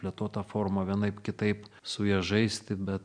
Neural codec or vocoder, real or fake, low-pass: none; real; 9.9 kHz